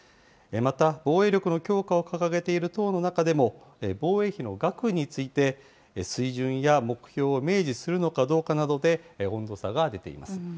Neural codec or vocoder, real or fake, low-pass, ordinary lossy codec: none; real; none; none